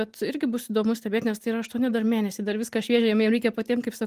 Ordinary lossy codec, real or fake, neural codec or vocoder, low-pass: Opus, 24 kbps; real; none; 14.4 kHz